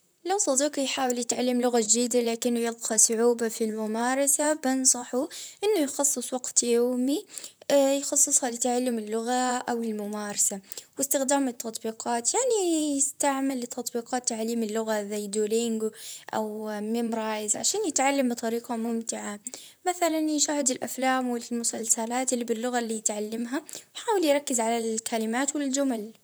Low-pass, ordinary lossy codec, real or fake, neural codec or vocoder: none; none; fake; vocoder, 44.1 kHz, 128 mel bands, Pupu-Vocoder